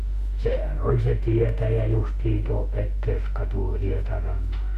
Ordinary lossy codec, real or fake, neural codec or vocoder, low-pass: none; fake; autoencoder, 48 kHz, 32 numbers a frame, DAC-VAE, trained on Japanese speech; 14.4 kHz